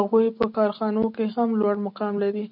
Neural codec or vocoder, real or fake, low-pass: none; real; 5.4 kHz